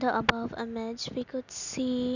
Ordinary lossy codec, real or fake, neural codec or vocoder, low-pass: none; real; none; 7.2 kHz